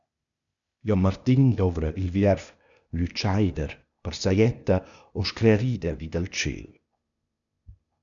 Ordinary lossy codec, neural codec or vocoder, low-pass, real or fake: MP3, 96 kbps; codec, 16 kHz, 0.8 kbps, ZipCodec; 7.2 kHz; fake